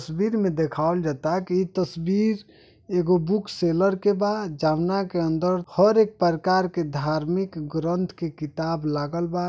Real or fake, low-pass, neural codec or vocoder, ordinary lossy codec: real; none; none; none